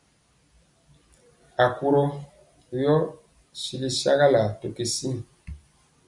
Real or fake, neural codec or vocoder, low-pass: real; none; 10.8 kHz